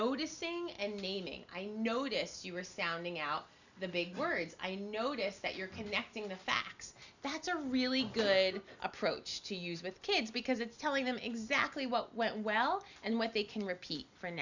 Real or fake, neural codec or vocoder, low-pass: real; none; 7.2 kHz